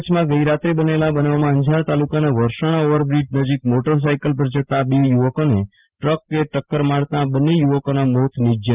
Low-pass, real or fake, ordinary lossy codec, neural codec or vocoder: 3.6 kHz; real; Opus, 24 kbps; none